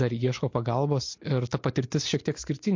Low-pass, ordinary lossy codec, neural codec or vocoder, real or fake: 7.2 kHz; MP3, 48 kbps; none; real